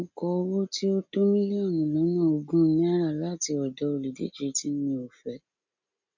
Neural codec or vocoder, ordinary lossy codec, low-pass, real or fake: none; none; 7.2 kHz; real